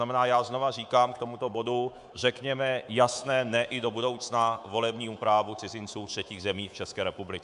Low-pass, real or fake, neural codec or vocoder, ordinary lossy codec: 10.8 kHz; fake; codec, 24 kHz, 3.1 kbps, DualCodec; Opus, 64 kbps